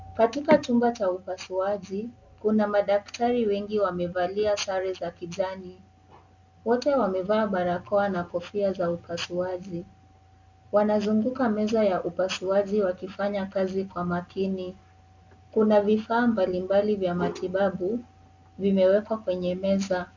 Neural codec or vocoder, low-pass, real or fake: none; 7.2 kHz; real